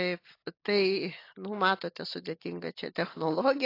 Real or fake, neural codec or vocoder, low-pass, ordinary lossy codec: real; none; 5.4 kHz; AAC, 32 kbps